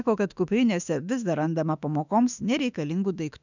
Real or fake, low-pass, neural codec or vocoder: fake; 7.2 kHz; autoencoder, 48 kHz, 32 numbers a frame, DAC-VAE, trained on Japanese speech